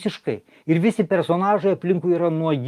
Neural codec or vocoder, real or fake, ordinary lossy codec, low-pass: none; real; Opus, 32 kbps; 14.4 kHz